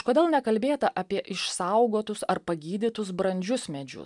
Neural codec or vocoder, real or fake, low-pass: none; real; 10.8 kHz